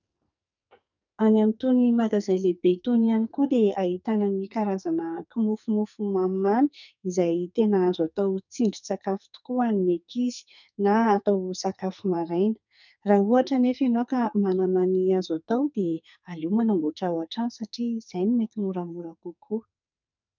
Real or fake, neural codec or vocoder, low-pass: fake; codec, 44.1 kHz, 2.6 kbps, SNAC; 7.2 kHz